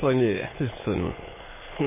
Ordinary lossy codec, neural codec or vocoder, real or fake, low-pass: MP3, 16 kbps; autoencoder, 22.05 kHz, a latent of 192 numbers a frame, VITS, trained on many speakers; fake; 3.6 kHz